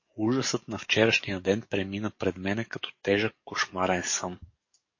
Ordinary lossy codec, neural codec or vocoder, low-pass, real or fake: MP3, 32 kbps; codec, 44.1 kHz, 7.8 kbps, DAC; 7.2 kHz; fake